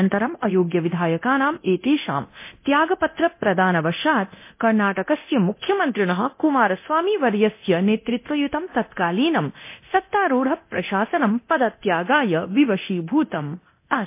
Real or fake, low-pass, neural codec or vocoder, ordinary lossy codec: fake; 3.6 kHz; codec, 24 kHz, 0.9 kbps, DualCodec; MP3, 24 kbps